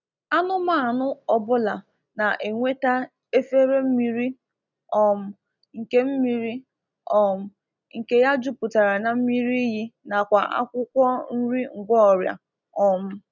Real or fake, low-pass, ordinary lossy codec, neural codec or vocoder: real; 7.2 kHz; none; none